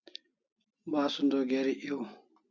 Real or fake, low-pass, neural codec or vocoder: real; 7.2 kHz; none